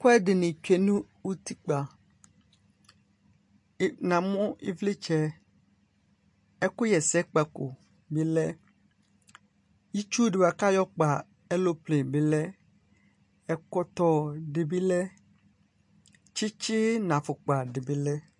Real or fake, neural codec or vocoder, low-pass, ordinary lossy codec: real; none; 10.8 kHz; MP3, 48 kbps